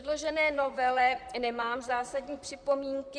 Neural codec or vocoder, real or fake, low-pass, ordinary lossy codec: vocoder, 44.1 kHz, 128 mel bands, Pupu-Vocoder; fake; 9.9 kHz; Opus, 64 kbps